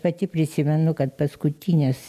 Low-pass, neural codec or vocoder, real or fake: 14.4 kHz; none; real